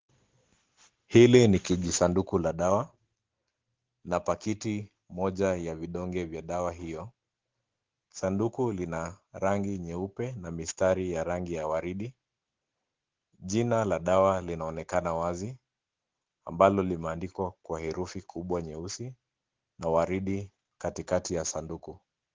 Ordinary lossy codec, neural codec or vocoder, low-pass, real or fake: Opus, 16 kbps; none; 7.2 kHz; real